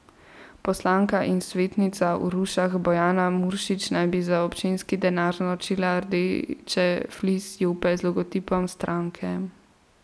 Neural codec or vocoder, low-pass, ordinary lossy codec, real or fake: none; none; none; real